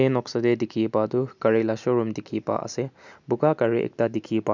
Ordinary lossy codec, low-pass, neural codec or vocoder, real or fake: none; 7.2 kHz; none; real